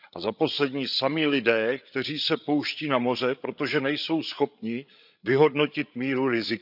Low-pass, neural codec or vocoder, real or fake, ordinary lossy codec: 5.4 kHz; codec, 16 kHz, 8 kbps, FreqCodec, larger model; fake; none